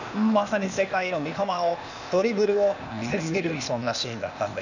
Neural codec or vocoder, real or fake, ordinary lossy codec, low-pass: codec, 16 kHz, 0.8 kbps, ZipCodec; fake; none; 7.2 kHz